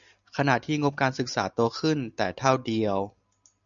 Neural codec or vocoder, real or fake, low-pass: none; real; 7.2 kHz